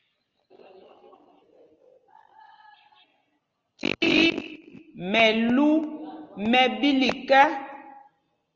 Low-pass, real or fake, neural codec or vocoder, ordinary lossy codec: 7.2 kHz; real; none; Opus, 24 kbps